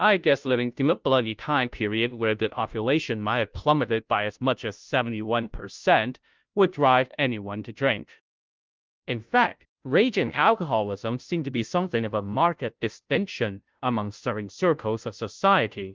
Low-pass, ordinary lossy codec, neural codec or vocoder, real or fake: 7.2 kHz; Opus, 24 kbps; codec, 16 kHz, 0.5 kbps, FunCodec, trained on Chinese and English, 25 frames a second; fake